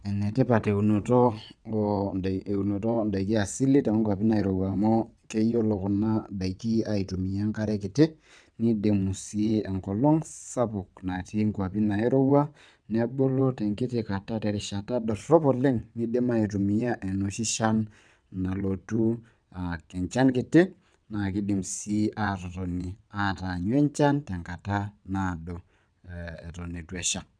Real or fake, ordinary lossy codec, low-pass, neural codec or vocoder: fake; none; 9.9 kHz; vocoder, 22.05 kHz, 80 mel bands, WaveNeXt